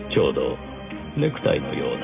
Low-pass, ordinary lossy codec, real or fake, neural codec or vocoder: 3.6 kHz; none; real; none